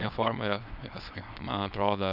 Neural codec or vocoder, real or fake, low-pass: codec, 24 kHz, 0.9 kbps, WavTokenizer, small release; fake; 5.4 kHz